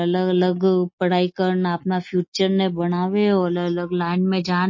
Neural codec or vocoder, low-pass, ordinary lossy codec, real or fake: none; 7.2 kHz; MP3, 32 kbps; real